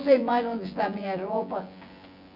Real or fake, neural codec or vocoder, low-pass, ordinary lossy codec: fake; vocoder, 24 kHz, 100 mel bands, Vocos; 5.4 kHz; none